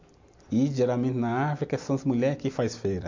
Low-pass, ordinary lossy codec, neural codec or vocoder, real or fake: 7.2 kHz; MP3, 48 kbps; none; real